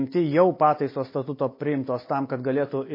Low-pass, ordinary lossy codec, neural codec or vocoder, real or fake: 5.4 kHz; MP3, 24 kbps; none; real